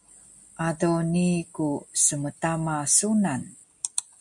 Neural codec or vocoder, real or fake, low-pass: none; real; 10.8 kHz